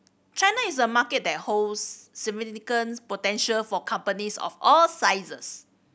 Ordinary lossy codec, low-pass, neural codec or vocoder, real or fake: none; none; none; real